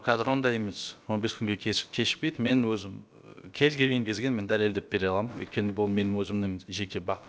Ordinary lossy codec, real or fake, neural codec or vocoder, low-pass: none; fake; codec, 16 kHz, about 1 kbps, DyCAST, with the encoder's durations; none